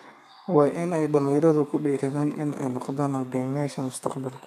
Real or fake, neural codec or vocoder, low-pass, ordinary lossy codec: fake; codec, 32 kHz, 1.9 kbps, SNAC; 14.4 kHz; none